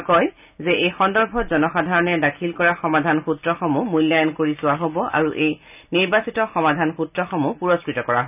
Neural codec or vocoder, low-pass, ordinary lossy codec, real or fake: none; 3.6 kHz; none; real